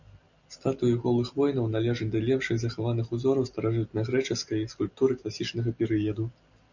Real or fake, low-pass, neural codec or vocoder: real; 7.2 kHz; none